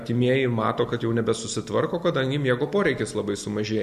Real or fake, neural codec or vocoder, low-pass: real; none; 14.4 kHz